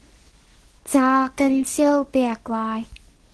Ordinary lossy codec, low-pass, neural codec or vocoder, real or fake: Opus, 16 kbps; 10.8 kHz; codec, 24 kHz, 0.9 kbps, WavTokenizer, medium speech release version 2; fake